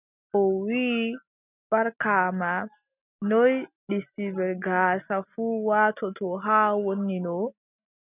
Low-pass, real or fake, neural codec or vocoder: 3.6 kHz; real; none